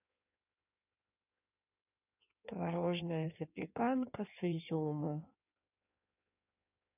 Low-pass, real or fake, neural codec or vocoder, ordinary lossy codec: 3.6 kHz; fake; codec, 16 kHz in and 24 kHz out, 1.1 kbps, FireRedTTS-2 codec; none